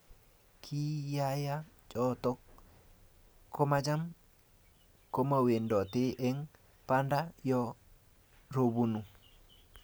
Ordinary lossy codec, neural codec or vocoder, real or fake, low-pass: none; none; real; none